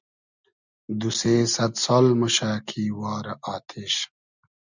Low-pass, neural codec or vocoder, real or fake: 7.2 kHz; none; real